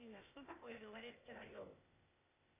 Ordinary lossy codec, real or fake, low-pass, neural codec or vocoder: AAC, 24 kbps; fake; 3.6 kHz; codec, 16 kHz, 0.8 kbps, ZipCodec